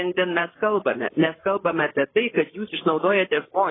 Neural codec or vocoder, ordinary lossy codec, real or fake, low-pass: codec, 16 kHz, 8 kbps, FreqCodec, larger model; AAC, 16 kbps; fake; 7.2 kHz